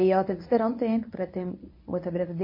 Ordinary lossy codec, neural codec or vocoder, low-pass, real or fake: MP3, 24 kbps; codec, 24 kHz, 0.9 kbps, WavTokenizer, medium speech release version 1; 5.4 kHz; fake